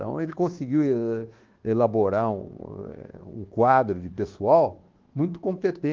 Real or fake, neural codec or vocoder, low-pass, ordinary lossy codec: fake; codec, 24 kHz, 1.2 kbps, DualCodec; 7.2 kHz; Opus, 16 kbps